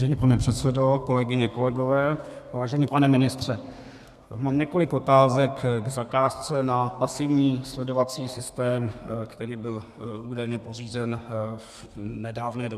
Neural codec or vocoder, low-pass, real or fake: codec, 32 kHz, 1.9 kbps, SNAC; 14.4 kHz; fake